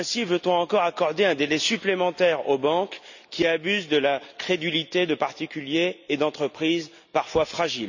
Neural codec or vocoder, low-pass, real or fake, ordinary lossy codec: none; 7.2 kHz; real; none